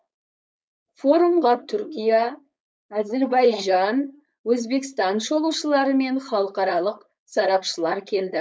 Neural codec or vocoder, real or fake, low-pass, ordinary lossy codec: codec, 16 kHz, 4.8 kbps, FACodec; fake; none; none